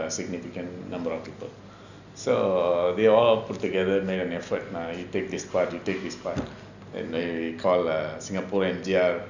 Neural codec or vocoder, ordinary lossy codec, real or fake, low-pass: none; none; real; 7.2 kHz